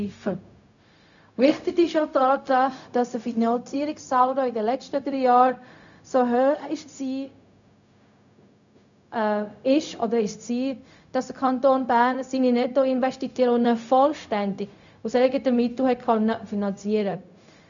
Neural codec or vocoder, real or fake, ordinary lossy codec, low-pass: codec, 16 kHz, 0.4 kbps, LongCat-Audio-Codec; fake; none; 7.2 kHz